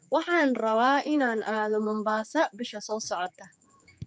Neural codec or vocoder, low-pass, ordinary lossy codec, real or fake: codec, 16 kHz, 4 kbps, X-Codec, HuBERT features, trained on general audio; none; none; fake